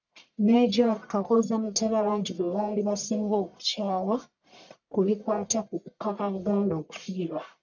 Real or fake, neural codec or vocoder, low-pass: fake; codec, 44.1 kHz, 1.7 kbps, Pupu-Codec; 7.2 kHz